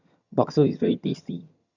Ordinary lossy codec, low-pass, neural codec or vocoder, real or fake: none; 7.2 kHz; vocoder, 22.05 kHz, 80 mel bands, HiFi-GAN; fake